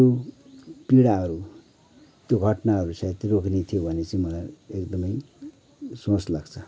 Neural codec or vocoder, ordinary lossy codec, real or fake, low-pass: none; none; real; none